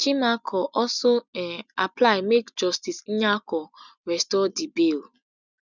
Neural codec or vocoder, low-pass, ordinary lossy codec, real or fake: none; 7.2 kHz; none; real